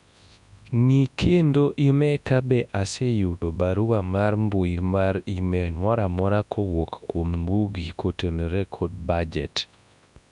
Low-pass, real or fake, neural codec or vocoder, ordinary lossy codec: 10.8 kHz; fake; codec, 24 kHz, 0.9 kbps, WavTokenizer, large speech release; none